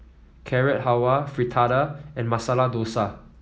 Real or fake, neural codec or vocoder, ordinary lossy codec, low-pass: real; none; none; none